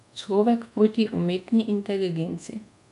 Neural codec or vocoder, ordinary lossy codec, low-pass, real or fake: codec, 24 kHz, 1.2 kbps, DualCodec; none; 10.8 kHz; fake